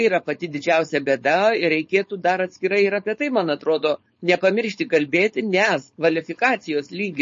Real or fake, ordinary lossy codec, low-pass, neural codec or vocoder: fake; MP3, 32 kbps; 7.2 kHz; codec, 16 kHz, 4.8 kbps, FACodec